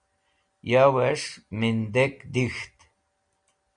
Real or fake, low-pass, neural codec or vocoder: real; 9.9 kHz; none